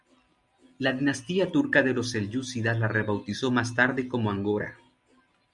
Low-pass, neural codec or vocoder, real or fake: 10.8 kHz; none; real